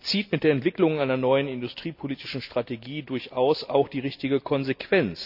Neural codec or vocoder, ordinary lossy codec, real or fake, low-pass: none; none; real; 5.4 kHz